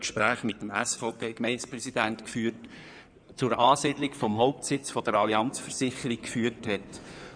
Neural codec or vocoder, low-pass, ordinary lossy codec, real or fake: codec, 16 kHz in and 24 kHz out, 2.2 kbps, FireRedTTS-2 codec; 9.9 kHz; none; fake